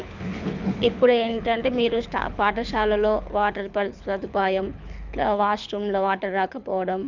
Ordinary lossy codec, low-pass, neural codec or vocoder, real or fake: none; 7.2 kHz; codec, 24 kHz, 6 kbps, HILCodec; fake